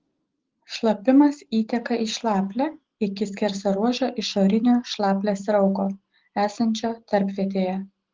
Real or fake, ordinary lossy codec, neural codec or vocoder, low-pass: real; Opus, 16 kbps; none; 7.2 kHz